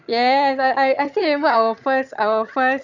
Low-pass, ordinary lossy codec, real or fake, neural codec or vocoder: 7.2 kHz; none; fake; vocoder, 22.05 kHz, 80 mel bands, HiFi-GAN